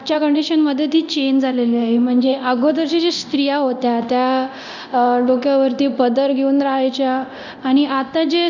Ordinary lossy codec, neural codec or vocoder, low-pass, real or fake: none; codec, 24 kHz, 0.9 kbps, DualCodec; 7.2 kHz; fake